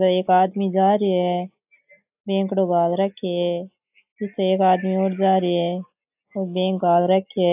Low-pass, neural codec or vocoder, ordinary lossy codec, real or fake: 3.6 kHz; none; AAC, 32 kbps; real